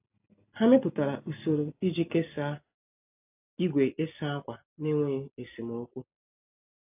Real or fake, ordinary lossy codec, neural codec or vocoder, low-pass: real; AAC, 32 kbps; none; 3.6 kHz